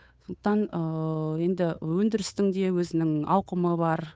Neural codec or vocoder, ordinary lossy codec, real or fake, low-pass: codec, 16 kHz, 8 kbps, FunCodec, trained on Chinese and English, 25 frames a second; none; fake; none